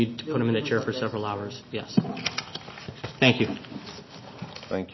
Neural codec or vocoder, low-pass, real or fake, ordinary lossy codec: none; 7.2 kHz; real; MP3, 24 kbps